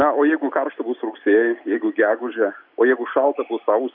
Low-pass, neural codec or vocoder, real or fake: 5.4 kHz; none; real